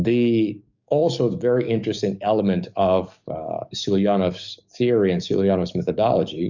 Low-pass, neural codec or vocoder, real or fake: 7.2 kHz; codec, 44.1 kHz, 7.8 kbps, DAC; fake